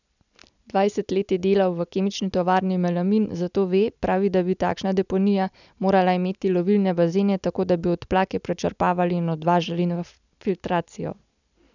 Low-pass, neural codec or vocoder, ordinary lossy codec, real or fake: 7.2 kHz; none; none; real